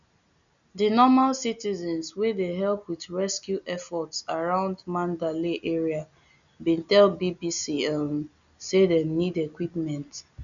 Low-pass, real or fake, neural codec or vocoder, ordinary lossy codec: 7.2 kHz; real; none; none